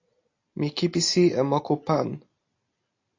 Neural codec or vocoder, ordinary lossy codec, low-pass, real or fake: none; AAC, 32 kbps; 7.2 kHz; real